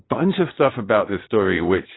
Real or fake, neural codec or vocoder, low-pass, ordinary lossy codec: fake; vocoder, 22.05 kHz, 80 mel bands, Vocos; 7.2 kHz; AAC, 16 kbps